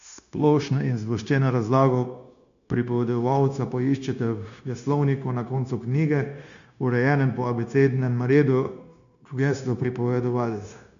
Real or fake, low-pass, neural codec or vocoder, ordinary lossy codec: fake; 7.2 kHz; codec, 16 kHz, 0.9 kbps, LongCat-Audio-Codec; none